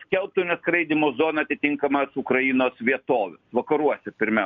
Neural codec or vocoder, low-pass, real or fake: none; 7.2 kHz; real